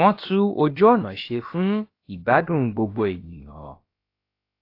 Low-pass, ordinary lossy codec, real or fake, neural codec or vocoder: 5.4 kHz; AAC, 32 kbps; fake; codec, 16 kHz, about 1 kbps, DyCAST, with the encoder's durations